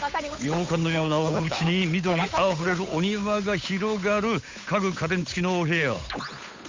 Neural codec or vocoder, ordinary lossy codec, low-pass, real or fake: codec, 16 kHz, 8 kbps, FunCodec, trained on Chinese and English, 25 frames a second; MP3, 64 kbps; 7.2 kHz; fake